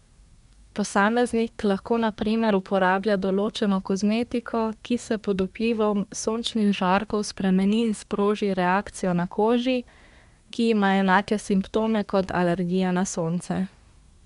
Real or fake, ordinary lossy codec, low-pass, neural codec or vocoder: fake; MP3, 96 kbps; 10.8 kHz; codec, 24 kHz, 1 kbps, SNAC